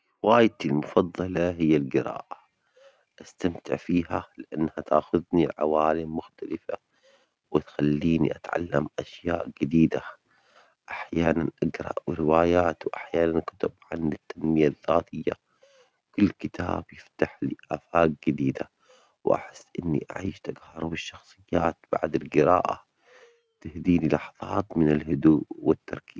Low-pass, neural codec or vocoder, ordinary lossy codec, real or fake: none; none; none; real